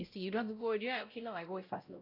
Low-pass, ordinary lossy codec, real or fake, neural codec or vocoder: 5.4 kHz; AAC, 32 kbps; fake; codec, 16 kHz, 0.5 kbps, X-Codec, WavLM features, trained on Multilingual LibriSpeech